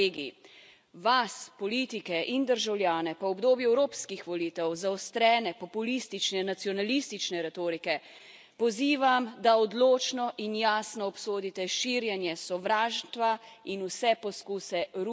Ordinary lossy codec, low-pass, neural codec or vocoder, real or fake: none; none; none; real